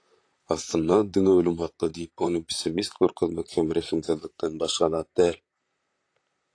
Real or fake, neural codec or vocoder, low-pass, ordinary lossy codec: fake; vocoder, 44.1 kHz, 128 mel bands, Pupu-Vocoder; 9.9 kHz; AAC, 48 kbps